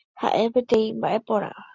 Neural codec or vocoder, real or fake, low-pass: none; real; 7.2 kHz